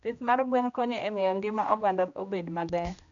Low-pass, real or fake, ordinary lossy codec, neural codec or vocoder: 7.2 kHz; fake; none; codec, 16 kHz, 1 kbps, X-Codec, HuBERT features, trained on general audio